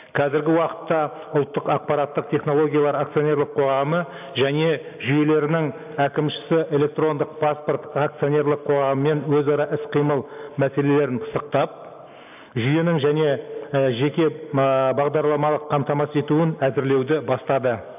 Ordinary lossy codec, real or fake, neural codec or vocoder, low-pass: AAC, 32 kbps; real; none; 3.6 kHz